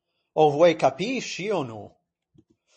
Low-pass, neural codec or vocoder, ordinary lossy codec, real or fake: 10.8 kHz; none; MP3, 32 kbps; real